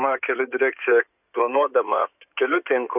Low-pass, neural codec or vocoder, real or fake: 3.6 kHz; codec, 16 kHz in and 24 kHz out, 2.2 kbps, FireRedTTS-2 codec; fake